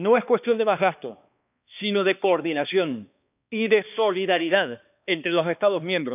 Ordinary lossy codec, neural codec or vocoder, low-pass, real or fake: none; codec, 16 kHz, 2 kbps, X-Codec, HuBERT features, trained on balanced general audio; 3.6 kHz; fake